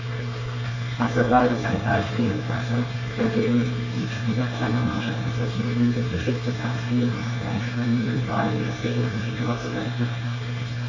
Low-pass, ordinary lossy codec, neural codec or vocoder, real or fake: 7.2 kHz; AAC, 48 kbps; codec, 24 kHz, 1 kbps, SNAC; fake